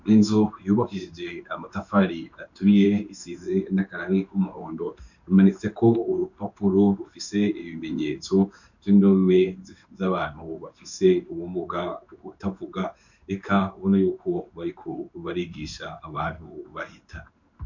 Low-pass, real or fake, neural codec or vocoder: 7.2 kHz; fake; codec, 16 kHz in and 24 kHz out, 1 kbps, XY-Tokenizer